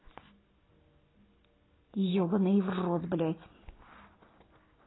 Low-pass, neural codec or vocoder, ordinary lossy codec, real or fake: 7.2 kHz; none; AAC, 16 kbps; real